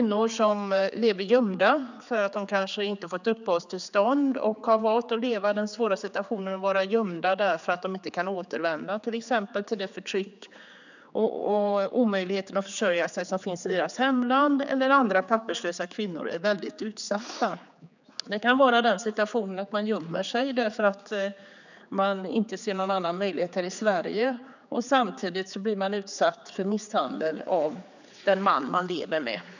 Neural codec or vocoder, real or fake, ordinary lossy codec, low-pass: codec, 16 kHz, 4 kbps, X-Codec, HuBERT features, trained on general audio; fake; none; 7.2 kHz